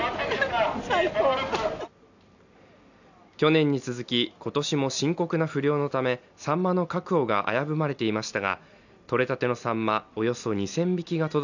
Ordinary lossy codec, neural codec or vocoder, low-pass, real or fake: none; none; 7.2 kHz; real